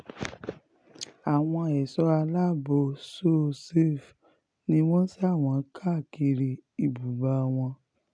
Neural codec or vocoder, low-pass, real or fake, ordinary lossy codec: none; 9.9 kHz; real; none